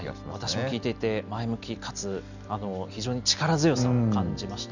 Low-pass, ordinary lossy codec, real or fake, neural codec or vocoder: 7.2 kHz; none; real; none